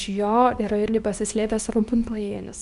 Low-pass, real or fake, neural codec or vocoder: 10.8 kHz; fake; codec, 24 kHz, 0.9 kbps, WavTokenizer, small release